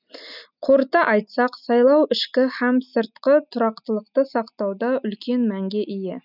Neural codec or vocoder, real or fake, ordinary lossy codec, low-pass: none; real; none; 5.4 kHz